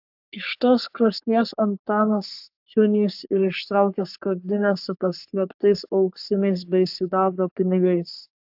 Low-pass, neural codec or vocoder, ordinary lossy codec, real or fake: 5.4 kHz; codec, 44.1 kHz, 3.4 kbps, Pupu-Codec; AAC, 48 kbps; fake